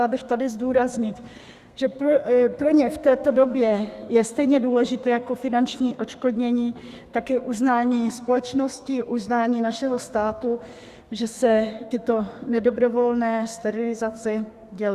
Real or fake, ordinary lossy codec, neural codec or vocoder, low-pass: fake; Opus, 64 kbps; codec, 32 kHz, 1.9 kbps, SNAC; 14.4 kHz